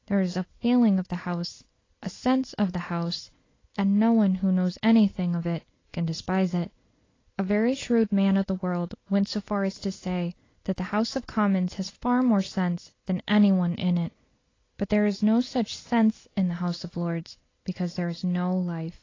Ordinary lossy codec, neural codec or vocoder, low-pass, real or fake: AAC, 32 kbps; none; 7.2 kHz; real